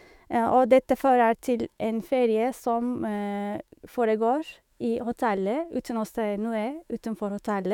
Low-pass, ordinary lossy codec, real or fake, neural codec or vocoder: 19.8 kHz; none; fake; autoencoder, 48 kHz, 128 numbers a frame, DAC-VAE, trained on Japanese speech